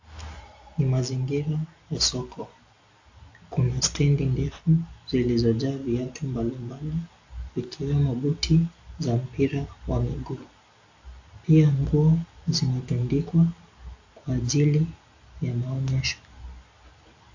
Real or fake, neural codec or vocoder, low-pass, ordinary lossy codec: real; none; 7.2 kHz; AAC, 48 kbps